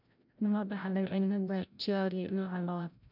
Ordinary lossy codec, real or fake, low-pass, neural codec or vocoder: none; fake; 5.4 kHz; codec, 16 kHz, 0.5 kbps, FreqCodec, larger model